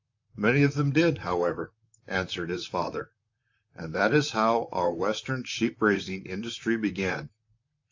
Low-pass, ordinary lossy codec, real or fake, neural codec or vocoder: 7.2 kHz; AAC, 48 kbps; fake; vocoder, 44.1 kHz, 128 mel bands, Pupu-Vocoder